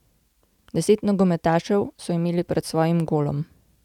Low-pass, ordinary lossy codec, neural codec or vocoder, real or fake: 19.8 kHz; none; none; real